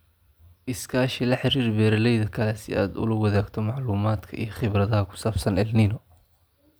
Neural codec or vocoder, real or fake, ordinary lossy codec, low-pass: none; real; none; none